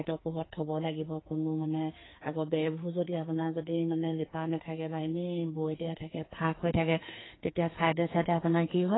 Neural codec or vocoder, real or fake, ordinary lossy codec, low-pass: codec, 44.1 kHz, 2.6 kbps, SNAC; fake; AAC, 16 kbps; 7.2 kHz